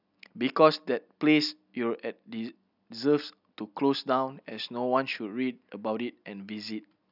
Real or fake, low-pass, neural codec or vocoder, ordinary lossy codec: real; 5.4 kHz; none; none